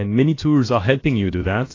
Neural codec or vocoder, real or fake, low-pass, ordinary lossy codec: codec, 16 kHz, about 1 kbps, DyCAST, with the encoder's durations; fake; 7.2 kHz; AAC, 32 kbps